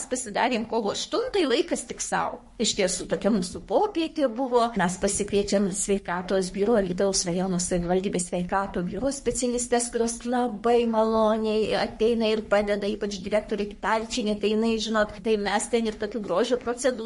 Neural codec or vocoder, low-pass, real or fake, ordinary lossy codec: codec, 44.1 kHz, 3.4 kbps, Pupu-Codec; 14.4 kHz; fake; MP3, 48 kbps